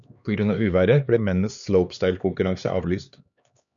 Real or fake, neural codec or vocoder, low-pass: fake; codec, 16 kHz, 2 kbps, X-Codec, HuBERT features, trained on LibriSpeech; 7.2 kHz